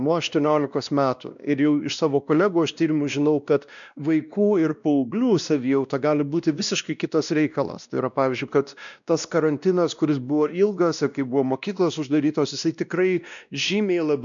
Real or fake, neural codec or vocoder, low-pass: fake; codec, 16 kHz, 1 kbps, X-Codec, WavLM features, trained on Multilingual LibriSpeech; 7.2 kHz